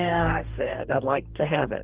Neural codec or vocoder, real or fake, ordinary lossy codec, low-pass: codec, 44.1 kHz, 2.6 kbps, DAC; fake; Opus, 16 kbps; 3.6 kHz